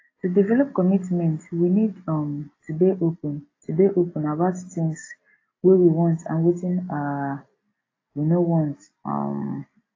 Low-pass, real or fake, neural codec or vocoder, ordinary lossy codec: 7.2 kHz; real; none; AAC, 32 kbps